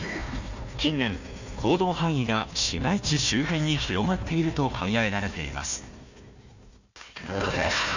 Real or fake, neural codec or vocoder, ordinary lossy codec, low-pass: fake; codec, 16 kHz, 1 kbps, FunCodec, trained on Chinese and English, 50 frames a second; none; 7.2 kHz